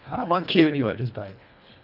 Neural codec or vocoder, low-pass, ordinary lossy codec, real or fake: codec, 24 kHz, 1.5 kbps, HILCodec; 5.4 kHz; none; fake